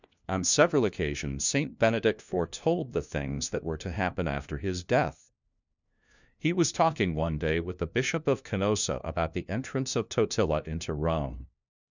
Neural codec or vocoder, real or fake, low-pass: codec, 16 kHz, 1 kbps, FunCodec, trained on LibriTTS, 50 frames a second; fake; 7.2 kHz